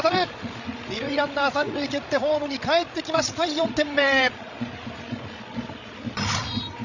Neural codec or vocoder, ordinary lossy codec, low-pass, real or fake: codec, 16 kHz, 16 kbps, FreqCodec, larger model; none; 7.2 kHz; fake